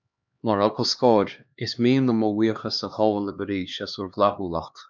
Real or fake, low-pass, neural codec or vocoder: fake; 7.2 kHz; codec, 16 kHz, 2 kbps, X-Codec, HuBERT features, trained on LibriSpeech